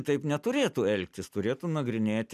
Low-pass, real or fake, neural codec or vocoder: 14.4 kHz; real; none